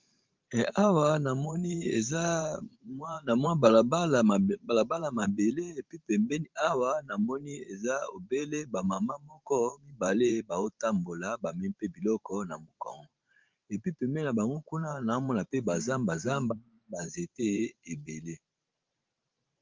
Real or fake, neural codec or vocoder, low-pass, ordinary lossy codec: fake; vocoder, 44.1 kHz, 128 mel bands every 512 samples, BigVGAN v2; 7.2 kHz; Opus, 32 kbps